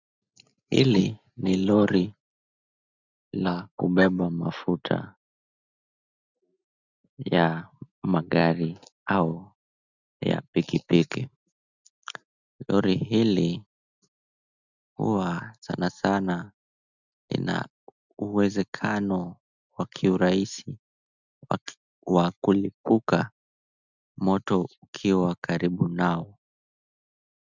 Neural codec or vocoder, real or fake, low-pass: none; real; 7.2 kHz